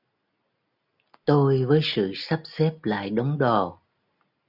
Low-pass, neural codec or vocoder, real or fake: 5.4 kHz; none; real